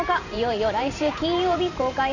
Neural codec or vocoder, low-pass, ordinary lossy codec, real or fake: vocoder, 44.1 kHz, 128 mel bands every 512 samples, BigVGAN v2; 7.2 kHz; none; fake